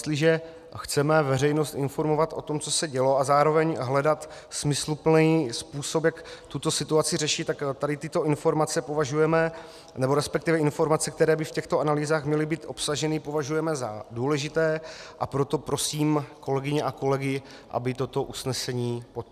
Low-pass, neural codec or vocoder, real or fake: 14.4 kHz; none; real